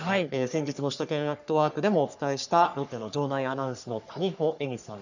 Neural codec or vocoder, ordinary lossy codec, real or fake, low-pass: codec, 44.1 kHz, 3.4 kbps, Pupu-Codec; none; fake; 7.2 kHz